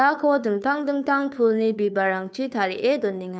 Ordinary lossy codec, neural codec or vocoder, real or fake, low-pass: none; codec, 16 kHz, 2 kbps, FunCodec, trained on Chinese and English, 25 frames a second; fake; none